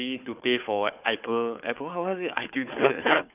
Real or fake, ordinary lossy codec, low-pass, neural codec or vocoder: fake; none; 3.6 kHz; codec, 16 kHz, 4 kbps, FunCodec, trained on Chinese and English, 50 frames a second